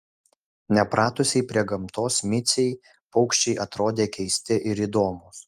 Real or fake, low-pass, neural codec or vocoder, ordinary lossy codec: real; 14.4 kHz; none; Opus, 32 kbps